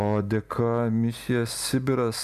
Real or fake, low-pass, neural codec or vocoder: real; 14.4 kHz; none